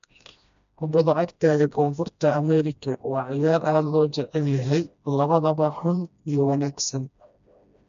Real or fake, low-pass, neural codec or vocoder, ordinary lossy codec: fake; 7.2 kHz; codec, 16 kHz, 1 kbps, FreqCodec, smaller model; MP3, 64 kbps